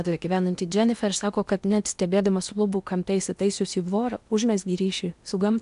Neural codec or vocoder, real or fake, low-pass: codec, 16 kHz in and 24 kHz out, 0.8 kbps, FocalCodec, streaming, 65536 codes; fake; 10.8 kHz